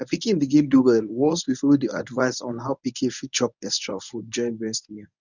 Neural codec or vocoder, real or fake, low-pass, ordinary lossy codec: codec, 24 kHz, 0.9 kbps, WavTokenizer, medium speech release version 1; fake; 7.2 kHz; none